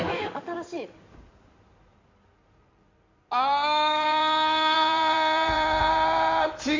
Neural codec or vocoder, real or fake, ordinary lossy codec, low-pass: codec, 16 kHz in and 24 kHz out, 2.2 kbps, FireRedTTS-2 codec; fake; MP3, 64 kbps; 7.2 kHz